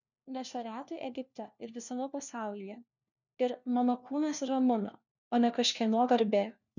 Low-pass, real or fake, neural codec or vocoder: 7.2 kHz; fake; codec, 16 kHz, 1 kbps, FunCodec, trained on LibriTTS, 50 frames a second